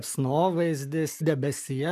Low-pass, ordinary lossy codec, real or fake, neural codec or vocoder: 14.4 kHz; MP3, 96 kbps; real; none